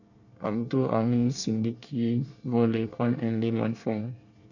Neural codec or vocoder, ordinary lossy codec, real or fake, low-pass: codec, 24 kHz, 1 kbps, SNAC; none; fake; 7.2 kHz